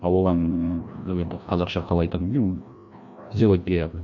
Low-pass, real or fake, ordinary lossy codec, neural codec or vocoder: 7.2 kHz; fake; none; codec, 16 kHz, 1 kbps, FreqCodec, larger model